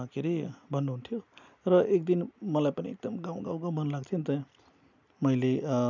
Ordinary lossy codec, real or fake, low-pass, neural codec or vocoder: none; real; 7.2 kHz; none